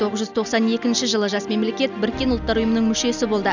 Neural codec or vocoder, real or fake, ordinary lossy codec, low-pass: none; real; none; 7.2 kHz